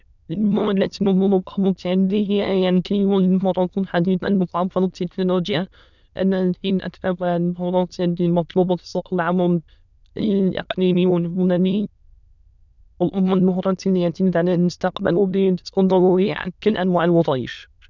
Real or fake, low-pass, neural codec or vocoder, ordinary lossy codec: fake; 7.2 kHz; autoencoder, 22.05 kHz, a latent of 192 numbers a frame, VITS, trained on many speakers; none